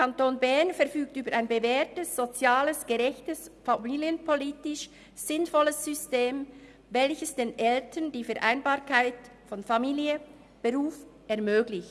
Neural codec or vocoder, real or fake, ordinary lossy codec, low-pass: none; real; none; none